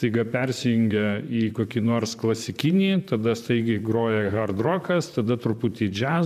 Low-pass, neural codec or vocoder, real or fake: 14.4 kHz; vocoder, 44.1 kHz, 128 mel bands, Pupu-Vocoder; fake